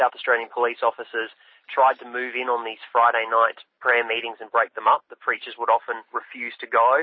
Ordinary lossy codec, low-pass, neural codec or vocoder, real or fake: MP3, 24 kbps; 7.2 kHz; none; real